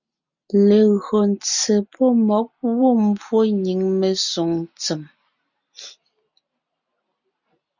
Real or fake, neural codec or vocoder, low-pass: real; none; 7.2 kHz